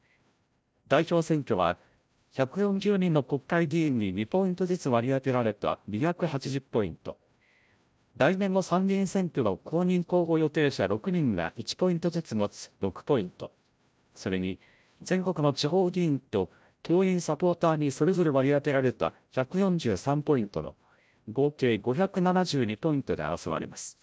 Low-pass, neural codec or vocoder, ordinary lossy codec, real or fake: none; codec, 16 kHz, 0.5 kbps, FreqCodec, larger model; none; fake